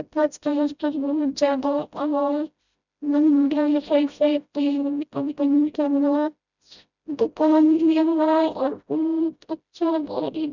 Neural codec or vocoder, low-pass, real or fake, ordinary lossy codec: codec, 16 kHz, 0.5 kbps, FreqCodec, smaller model; 7.2 kHz; fake; none